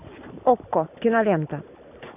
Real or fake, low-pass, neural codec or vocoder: real; 3.6 kHz; none